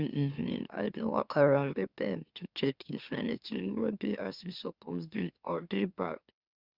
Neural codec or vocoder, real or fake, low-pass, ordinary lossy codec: autoencoder, 44.1 kHz, a latent of 192 numbers a frame, MeloTTS; fake; 5.4 kHz; Opus, 64 kbps